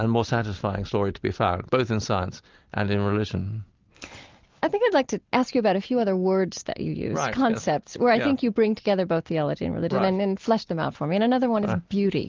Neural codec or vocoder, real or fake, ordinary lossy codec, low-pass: none; real; Opus, 32 kbps; 7.2 kHz